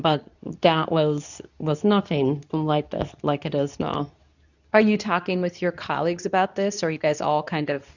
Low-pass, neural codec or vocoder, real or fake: 7.2 kHz; codec, 24 kHz, 0.9 kbps, WavTokenizer, medium speech release version 2; fake